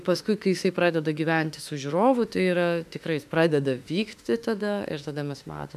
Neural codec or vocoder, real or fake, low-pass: autoencoder, 48 kHz, 32 numbers a frame, DAC-VAE, trained on Japanese speech; fake; 14.4 kHz